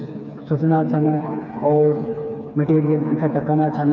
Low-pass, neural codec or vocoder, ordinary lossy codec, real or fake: 7.2 kHz; codec, 16 kHz, 4 kbps, FreqCodec, smaller model; MP3, 48 kbps; fake